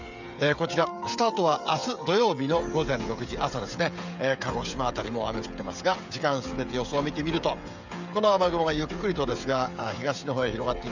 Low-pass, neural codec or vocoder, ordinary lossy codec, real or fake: 7.2 kHz; codec, 16 kHz, 16 kbps, FreqCodec, smaller model; none; fake